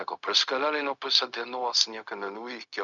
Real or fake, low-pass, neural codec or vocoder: fake; 7.2 kHz; codec, 16 kHz, 0.4 kbps, LongCat-Audio-Codec